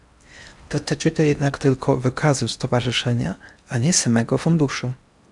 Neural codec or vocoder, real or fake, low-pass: codec, 16 kHz in and 24 kHz out, 0.6 kbps, FocalCodec, streaming, 4096 codes; fake; 10.8 kHz